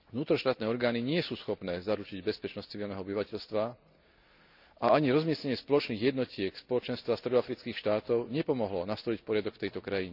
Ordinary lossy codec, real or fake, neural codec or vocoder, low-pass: none; real; none; 5.4 kHz